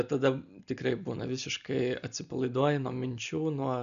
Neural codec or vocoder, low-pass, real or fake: none; 7.2 kHz; real